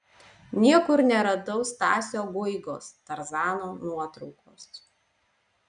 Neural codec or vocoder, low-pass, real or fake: none; 9.9 kHz; real